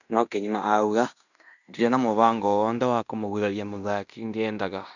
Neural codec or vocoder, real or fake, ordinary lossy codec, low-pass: codec, 16 kHz in and 24 kHz out, 0.9 kbps, LongCat-Audio-Codec, fine tuned four codebook decoder; fake; none; 7.2 kHz